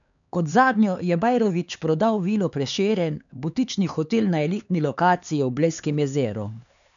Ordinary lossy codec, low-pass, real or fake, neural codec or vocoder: none; 7.2 kHz; fake; codec, 16 kHz, 4 kbps, X-Codec, HuBERT features, trained on LibriSpeech